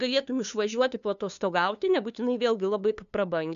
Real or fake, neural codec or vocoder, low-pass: fake; codec, 16 kHz, 2 kbps, FunCodec, trained on LibriTTS, 25 frames a second; 7.2 kHz